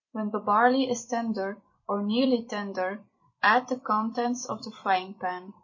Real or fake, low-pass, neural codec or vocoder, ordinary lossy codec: fake; 7.2 kHz; vocoder, 44.1 kHz, 128 mel bands every 256 samples, BigVGAN v2; MP3, 32 kbps